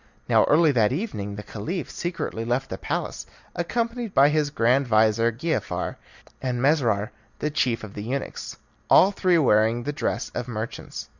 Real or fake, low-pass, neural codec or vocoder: real; 7.2 kHz; none